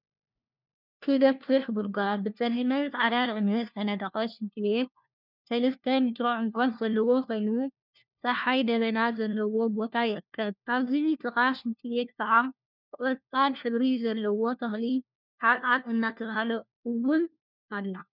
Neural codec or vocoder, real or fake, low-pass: codec, 16 kHz, 1 kbps, FunCodec, trained on LibriTTS, 50 frames a second; fake; 5.4 kHz